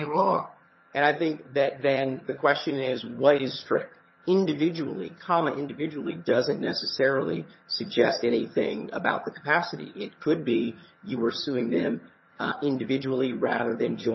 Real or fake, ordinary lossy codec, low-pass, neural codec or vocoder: fake; MP3, 24 kbps; 7.2 kHz; vocoder, 22.05 kHz, 80 mel bands, HiFi-GAN